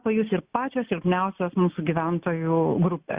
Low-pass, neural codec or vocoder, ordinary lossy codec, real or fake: 3.6 kHz; none; Opus, 64 kbps; real